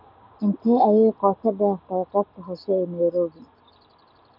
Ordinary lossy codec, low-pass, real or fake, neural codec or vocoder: MP3, 48 kbps; 5.4 kHz; real; none